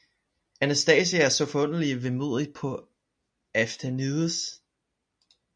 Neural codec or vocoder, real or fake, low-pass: none; real; 9.9 kHz